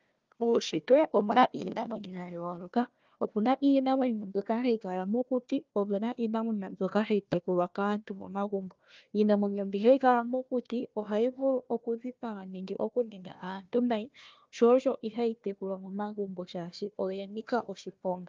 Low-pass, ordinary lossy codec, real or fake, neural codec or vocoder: 7.2 kHz; Opus, 24 kbps; fake; codec, 16 kHz, 1 kbps, FunCodec, trained on Chinese and English, 50 frames a second